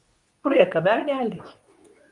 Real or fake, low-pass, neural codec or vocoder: fake; 10.8 kHz; codec, 24 kHz, 0.9 kbps, WavTokenizer, medium speech release version 2